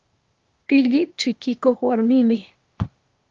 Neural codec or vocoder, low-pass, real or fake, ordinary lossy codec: codec, 16 kHz, 0.8 kbps, ZipCodec; 7.2 kHz; fake; Opus, 32 kbps